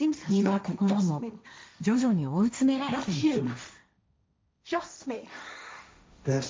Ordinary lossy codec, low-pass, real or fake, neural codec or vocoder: none; none; fake; codec, 16 kHz, 1.1 kbps, Voila-Tokenizer